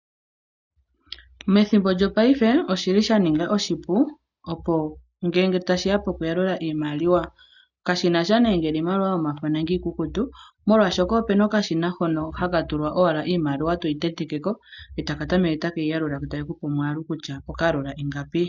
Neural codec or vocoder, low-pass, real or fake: none; 7.2 kHz; real